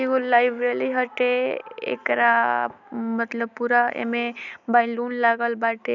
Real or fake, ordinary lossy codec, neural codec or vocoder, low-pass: real; none; none; 7.2 kHz